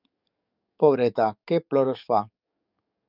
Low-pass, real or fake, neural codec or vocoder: 5.4 kHz; fake; codec, 44.1 kHz, 7.8 kbps, DAC